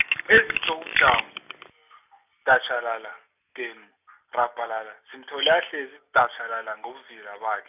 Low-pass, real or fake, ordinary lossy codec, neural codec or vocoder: 3.6 kHz; real; none; none